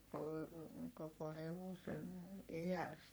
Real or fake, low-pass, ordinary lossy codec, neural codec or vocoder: fake; none; none; codec, 44.1 kHz, 3.4 kbps, Pupu-Codec